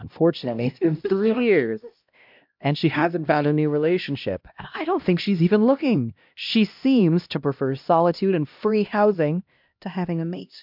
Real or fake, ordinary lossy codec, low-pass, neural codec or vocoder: fake; MP3, 48 kbps; 5.4 kHz; codec, 16 kHz, 1 kbps, X-Codec, HuBERT features, trained on LibriSpeech